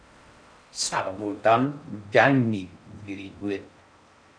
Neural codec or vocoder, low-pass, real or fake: codec, 16 kHz in and 24 kHz out, 0.6 kbps, FocalCodec, streaming, 4096 codes; 9.9 kHz; fake